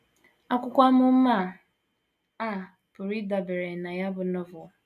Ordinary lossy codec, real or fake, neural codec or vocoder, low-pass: AAC, 96 kbps; real; none; 14.4 kHz